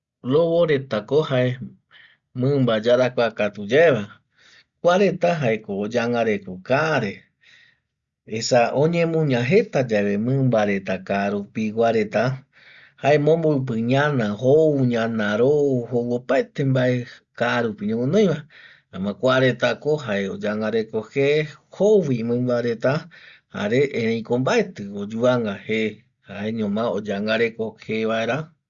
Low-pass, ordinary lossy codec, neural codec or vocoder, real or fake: 7.2 kHz; Opus, 64 kbps; none; real